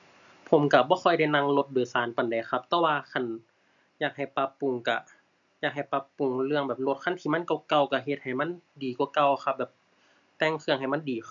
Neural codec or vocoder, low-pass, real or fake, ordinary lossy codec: none; 7.2 kHz; real; none